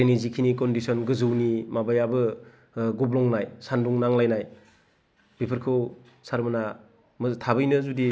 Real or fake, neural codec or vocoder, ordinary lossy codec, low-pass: real; none; none; none